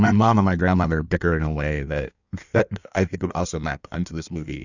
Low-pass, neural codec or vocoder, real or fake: 7.2 kHz; codec, 16 kHz in and 24 kHz out, 1.1 kbps, FireRedTTS-2 codec; fake